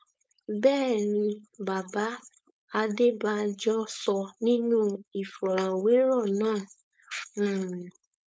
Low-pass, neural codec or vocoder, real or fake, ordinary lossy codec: none; codec, 16 kHz, 4.8 kbps, FACodec; fake; none